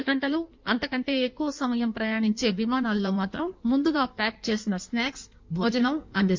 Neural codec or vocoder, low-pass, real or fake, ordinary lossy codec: codec, 16 kHz in and 24 kHz out, 1.1 kbps, FireRedTTS-2 codec; 7.2 kHz; fake; MP3, 64 kbps